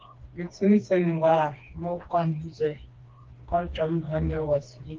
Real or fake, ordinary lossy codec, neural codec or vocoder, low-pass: fake; Opus, 32 kbps; codec, 16 kHz, 2 kbps, FreqCodec, smaller model; 7.2 kHz